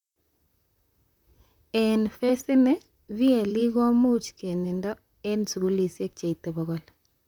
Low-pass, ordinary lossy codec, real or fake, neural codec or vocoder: 19.8 kHz; none; fake; vocoder, 44.1 kHz, 128 mel bands, Pupu-Vocoder